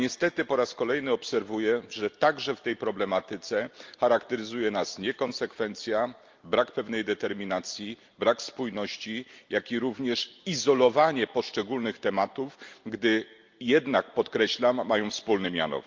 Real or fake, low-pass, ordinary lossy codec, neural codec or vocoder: real; 7.2 kHz; Opus, 24 kbps; none